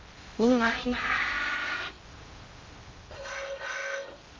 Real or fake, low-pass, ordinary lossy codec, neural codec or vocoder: fake; 7.2 kHz; Opus, 32 kbps; codec, 16 kHz in and 24 kHz out, 0.6 kbps, FocalCodec, streaming, 2048 codes